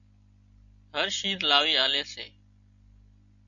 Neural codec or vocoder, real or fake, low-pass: none; real; 7.2 kHz